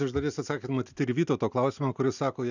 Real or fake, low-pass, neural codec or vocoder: real; 7.2 kHz; none